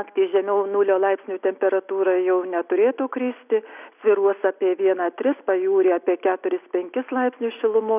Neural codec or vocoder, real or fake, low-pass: none; real; 3.6 kHz